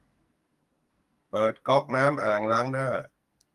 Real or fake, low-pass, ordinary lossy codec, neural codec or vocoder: fake; 14.4 kHz; Opus, 32 kbps; codec, 44.1 kHz, 2.6 kbps, SNAC